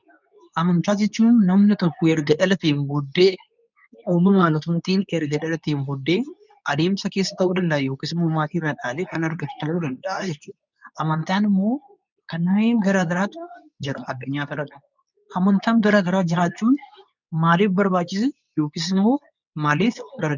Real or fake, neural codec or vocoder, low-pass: fake; codec, 24 kHz, 0.9 kbps, WavTokenizer, medium speech release version 2; 7.2 kHz